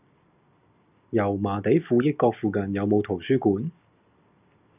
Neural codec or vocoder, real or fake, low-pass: none; real; 3.6 kHz